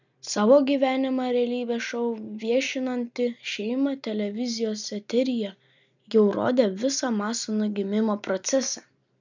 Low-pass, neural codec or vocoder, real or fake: 7.2 kHz; none; real